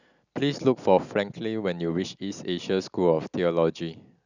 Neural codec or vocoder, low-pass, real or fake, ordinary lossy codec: none; 7.2 kHz; real; none